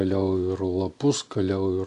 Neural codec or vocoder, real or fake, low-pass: none; real; 10.8 kHz